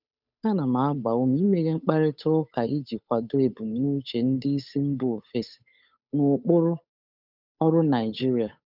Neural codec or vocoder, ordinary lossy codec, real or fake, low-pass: codec, 16 kHz, 8 kbps, FunCodec, trained on Chinese and English, 25 frames a second; none; fake; 5.4 kHz